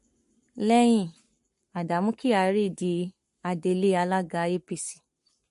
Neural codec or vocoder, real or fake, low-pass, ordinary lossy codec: codec, 44.1 kHz, 7.8 kbps, Pupu-Codec; fake; 14.4 kHz; MP3, 48 kbps